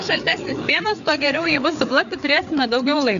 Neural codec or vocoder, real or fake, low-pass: codec, 16 kHz, 4 kbps, FreqCodec, larger model; fake; 7.2 kHz